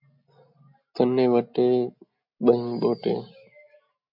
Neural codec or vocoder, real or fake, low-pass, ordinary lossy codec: none; real; 5.4 kHz; MP3, 48 kbps